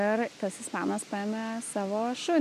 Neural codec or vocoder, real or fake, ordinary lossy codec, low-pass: none; real; MP3, 96 kbps; 14.4 kHz